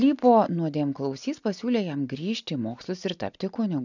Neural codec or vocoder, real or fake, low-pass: none; real; 7.2 kHz